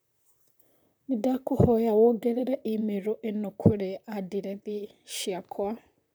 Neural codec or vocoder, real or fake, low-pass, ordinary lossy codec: vocoder, 44.1 kHz, 128 mel bands, Pupu-Vocoder; fake; none; none